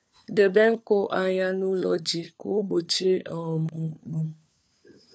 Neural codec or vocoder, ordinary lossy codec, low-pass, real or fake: codec, 16 kHz, 4 kbps, FunCodec, trained on LibriTTS, 50 frames a second; none; none; fake